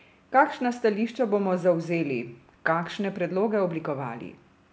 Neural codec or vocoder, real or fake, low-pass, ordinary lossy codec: none; real; none; none